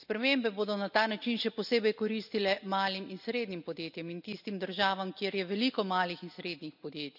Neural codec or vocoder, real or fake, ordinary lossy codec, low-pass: none; real; none; 5.4 kHz